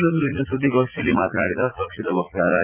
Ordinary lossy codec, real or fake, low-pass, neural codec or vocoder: Opus, 24 kbps; fake; 3.6 kHz; vocoder, 44.1 kHz, 80 mel bands, Vocos